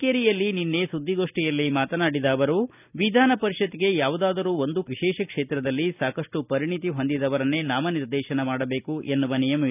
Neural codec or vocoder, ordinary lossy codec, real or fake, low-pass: none; none; real; 3.6 kHz